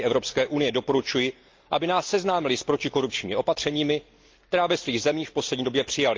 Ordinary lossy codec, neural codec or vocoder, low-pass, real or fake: Opus, 32 kbps; none; 7.2 kHz; real